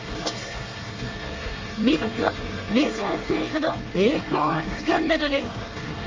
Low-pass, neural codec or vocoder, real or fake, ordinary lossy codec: 7.2 kHz; codec, 24 kHz, 1 kbps, SNAC; fake; Opus, 32 kbps